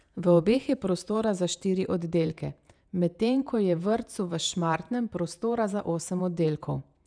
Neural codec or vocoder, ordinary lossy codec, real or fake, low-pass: vocoder, 48 kHz, 128 mel bands, Vocos; none; fake; 9.9 kHz